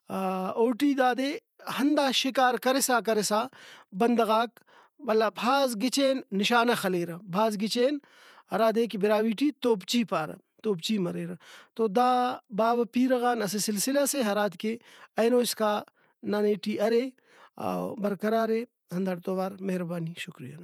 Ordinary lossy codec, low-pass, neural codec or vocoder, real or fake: none; 19.8 kHz; vocoder, 48 kHz, 128 mel bands, Vocos; fake